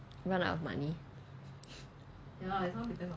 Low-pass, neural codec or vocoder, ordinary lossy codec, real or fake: none; none; none; real